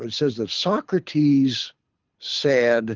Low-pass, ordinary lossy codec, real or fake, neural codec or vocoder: 7.2 kHz; Opus, 24 kbps; fake; codec, 24 kHz, 6 kbps, HILCodec